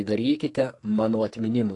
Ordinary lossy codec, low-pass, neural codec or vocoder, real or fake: AAC, 48 kbps; 10.8 kHz; codec, 44.1 kHz, 2.6 kbps, SNAC; fake